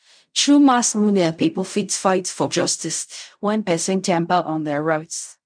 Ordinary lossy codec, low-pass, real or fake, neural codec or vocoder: none; 9.9 kHz; fake; codec, 16 kHz in and 24 kHz out, 0.4 kbps, LongCat-Audio-Codec, fine tuned four codebook decoder